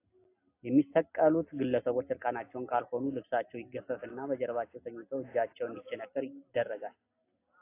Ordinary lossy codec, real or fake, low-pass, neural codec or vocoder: AAC, 24 kbps; real; 3.6 kHz; none